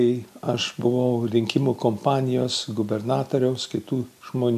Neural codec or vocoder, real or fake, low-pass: none; real; 14.4 kHz